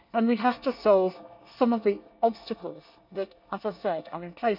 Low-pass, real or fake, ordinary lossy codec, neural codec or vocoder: 5.4 kHz; fake; none; codec, 24 kHz, 1 kbps, SNAC